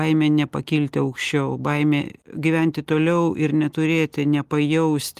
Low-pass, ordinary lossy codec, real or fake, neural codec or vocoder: 14.4 kHz; Opus, 24 kbps; real; none